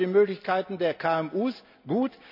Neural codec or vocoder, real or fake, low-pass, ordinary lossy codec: none; real; 5.4 kHz; none